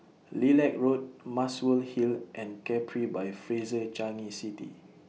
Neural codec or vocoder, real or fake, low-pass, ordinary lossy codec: none; real; none; none